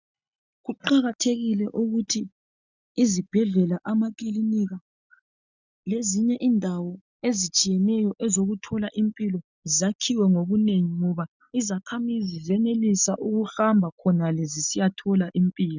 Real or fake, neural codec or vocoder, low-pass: real; none; 7.2 kHz